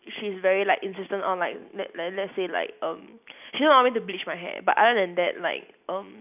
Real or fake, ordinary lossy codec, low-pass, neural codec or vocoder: real; none; 3.6 kHz; none